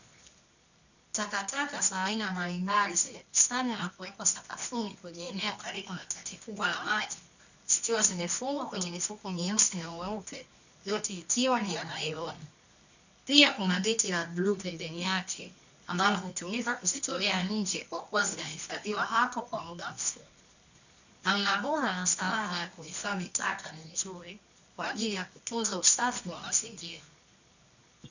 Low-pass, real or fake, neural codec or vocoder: 7.2 kHz; fake; codec, 24 kHz, 0.9 kbps, WavTokenizer, medium music audio release